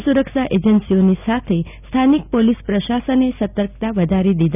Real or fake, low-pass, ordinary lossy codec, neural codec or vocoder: real; 3.6 kHz; none; none